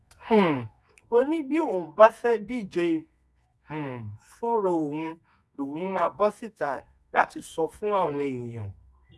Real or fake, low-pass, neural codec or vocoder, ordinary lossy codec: fake; none; codec, 24 kHz, 0.9 kbps, WavTokenizer, medium music audio release; none